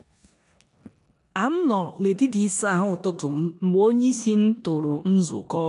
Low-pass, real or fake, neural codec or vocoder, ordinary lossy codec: 10.8 kHz; fake; codec, 16 kHz in and 24 kHz out, 0.9 kbps, LongCat-Audio-Codec, four codebook decoder; AAC, 64 kbps